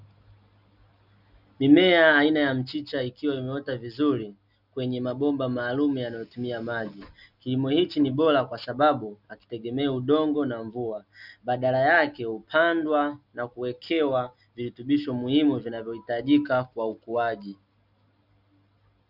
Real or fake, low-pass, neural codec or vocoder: real; 5.4 kHz; none